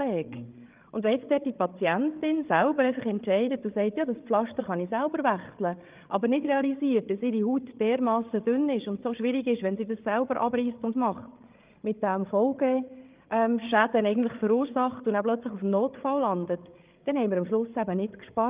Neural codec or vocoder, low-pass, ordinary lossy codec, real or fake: codec, 16 kHz, 8 kbps, FreqCodec, larger model; 3.6 kHz; Opus, 32 kbps; fake